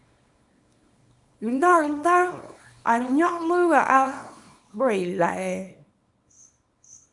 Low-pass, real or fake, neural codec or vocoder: 10.8 kHz; fake; codec, 24 kHz, 0.9 kbps, WavTokenizer, small release